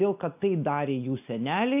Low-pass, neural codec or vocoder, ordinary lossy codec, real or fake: 3.6 kHz; none; AAC, 32 kbps; real